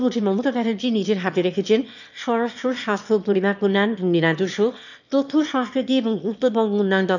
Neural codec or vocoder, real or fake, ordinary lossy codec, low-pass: autoencoder, 22.05 kHz, a latent of 192 numbers a frame, VITS, trained on one speaker; fake; none; 7.2 kHz